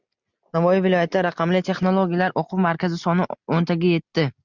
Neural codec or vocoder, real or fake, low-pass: none; real; 7.2 kHz